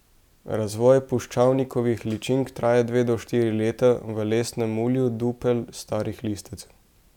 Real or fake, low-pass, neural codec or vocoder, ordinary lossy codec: real; 19.8 kHz; none; none